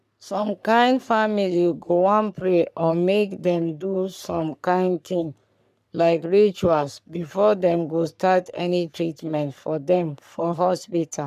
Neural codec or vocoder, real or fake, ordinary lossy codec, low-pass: codec, 44.1 kHz, 3.4 kbps, Pupu-Codec; fake; none; 14.4 kHz